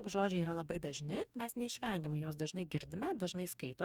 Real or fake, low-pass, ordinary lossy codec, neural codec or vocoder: fake; 19.8 kHz; Opus, 64 kbps; codec, 44.1 kHz, 2.6 kbps, DAC